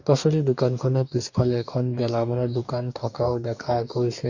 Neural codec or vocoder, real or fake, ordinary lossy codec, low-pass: codec, 44.1 kHz, 2.6 kbps, DAC; fake; none; 7.2 kHz